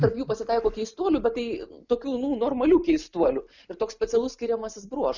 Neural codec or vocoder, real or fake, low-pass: none; real; 7.2 kHz